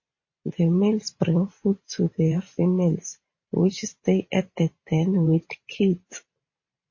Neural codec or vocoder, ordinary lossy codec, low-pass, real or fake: vocoder, 44.1 kHz, 128 mel bands every 512 samples, BigVGAN v2; MP3, 32 kbps; 7.2 kHz; fake